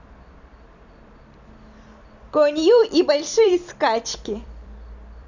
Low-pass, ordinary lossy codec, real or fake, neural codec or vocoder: 7.2 kHz; none; fake; vocoder, 44.1 kHz, 128 mel bands every 256 samples, BigVGAN v2